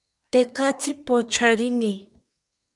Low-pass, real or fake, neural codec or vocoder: 10.8 kHz; fake; codec, 24 kHz, 1 kbps, SNAC